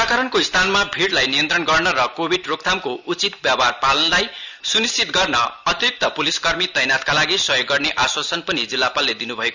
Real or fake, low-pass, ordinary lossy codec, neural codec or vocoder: real; 7.2 kHz; none; none